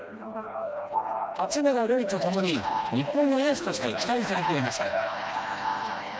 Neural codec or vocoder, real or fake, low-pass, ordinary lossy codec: codec, 16 kHz, 1 kbps, FreqCodec, smaller model; fake; none; none